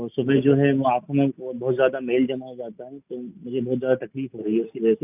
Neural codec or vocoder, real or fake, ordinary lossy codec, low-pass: none; real; none; 3.6 kHz